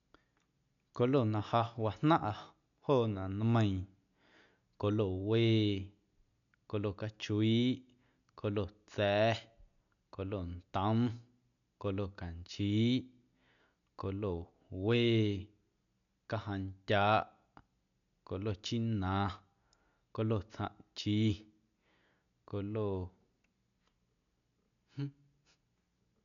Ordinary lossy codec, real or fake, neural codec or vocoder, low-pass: none; real; none; 7.2 kHz